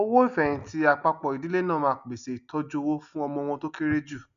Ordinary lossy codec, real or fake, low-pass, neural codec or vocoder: none; real; 7.2 kHz; none